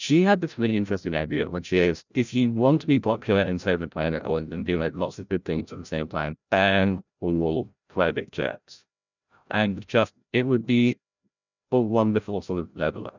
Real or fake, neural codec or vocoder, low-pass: fake; codec, 16 kHz, 0.5 kbps, FreqCodec, larger model; 7.2 kHz